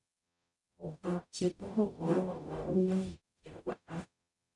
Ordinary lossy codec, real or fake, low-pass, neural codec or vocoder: none; fake; 10.8 kHz; codec, 44.1 kHz, 0.9 kbps, DAC